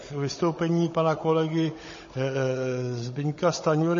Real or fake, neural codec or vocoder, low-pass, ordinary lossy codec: fake; codec, 16 kHz, 16 kbps, FunCodec, trained on Chinese and English, 50 frames a second; 7.2 kHz; MP3, 32 kbps